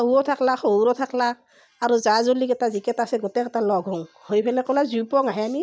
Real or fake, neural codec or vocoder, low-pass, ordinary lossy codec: real; none; none; none